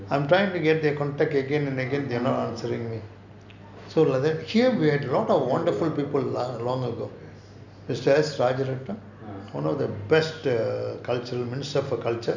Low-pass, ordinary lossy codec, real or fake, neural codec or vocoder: 7.2 kHz; none; real; none